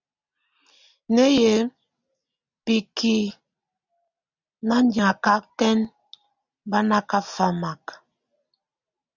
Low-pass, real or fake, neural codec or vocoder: 7.2 kHz; real; none